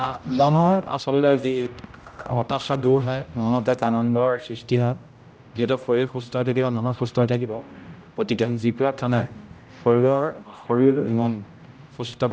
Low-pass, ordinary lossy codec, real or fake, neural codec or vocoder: none; none; fake; codec, 16 kHz, 0.5 kbps, X-Codec, HuBERT features, trained on general audio